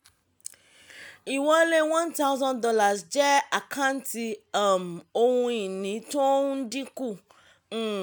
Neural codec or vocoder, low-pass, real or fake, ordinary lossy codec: none; none; real; none